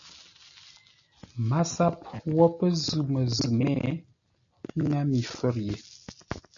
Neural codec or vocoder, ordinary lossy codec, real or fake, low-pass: none; MP3, 96 kbps; real; 7.2 kHz